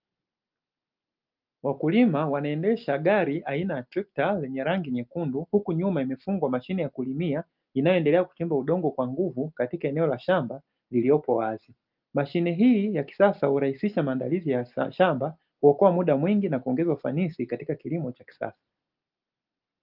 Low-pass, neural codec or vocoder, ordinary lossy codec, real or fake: 5.4 kHz; none; Opus, 24 kbps; real